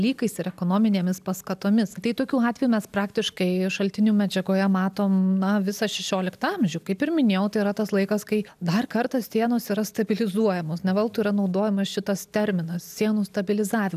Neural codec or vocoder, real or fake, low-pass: none; real; 14.4 kHz